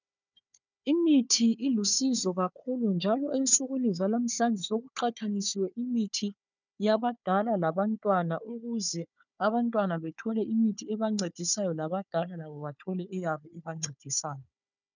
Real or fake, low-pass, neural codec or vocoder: fake; 7.2 kHz; codec, 16 kHz, 4 kbps, FunCodec, trained on Chinese and English, 50 frames a second